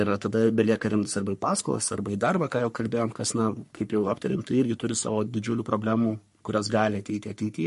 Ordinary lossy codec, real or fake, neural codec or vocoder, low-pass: MP3, 48 kbps; fake; codec, 44.1 kHz, 3.4 kbps, Pupu-Codec; 14.4 kHz